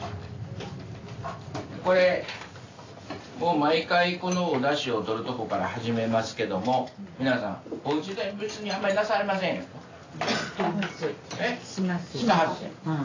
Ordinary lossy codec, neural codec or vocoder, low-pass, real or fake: AAC, 32 kbps; none; 7.2 kHz; real